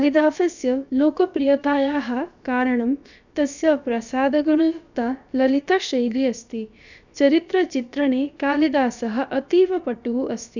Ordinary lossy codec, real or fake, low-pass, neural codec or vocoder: none; fake; 7.2 kHz; codec, 16 kHz, about 1 kbps, DyCAST, with the encoder's durations